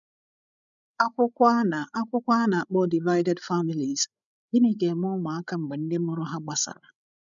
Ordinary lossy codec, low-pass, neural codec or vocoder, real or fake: none; 7.2 kHz; codec, 16 kHz, 16 kbps, FreqCodec, larger model; fake